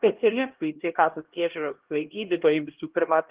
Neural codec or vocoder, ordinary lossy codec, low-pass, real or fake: codec, 16 kHz, 1 kbps, X-Codec, HuBERT features, trained on LibriSpeech; Opus, 16 kbps; 3.6 kHz; fake